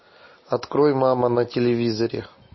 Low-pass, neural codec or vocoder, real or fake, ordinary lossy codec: 7.2 kHz; none; real; MP3, 24 kbps